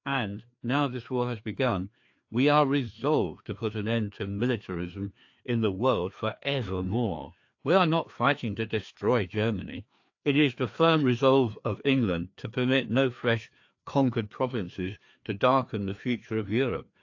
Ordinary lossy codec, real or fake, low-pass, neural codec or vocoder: AAC, 48 kbps; fake; 7.2 kHz; codec, 16 kHz, 2 kbps, FreqCodec, larger model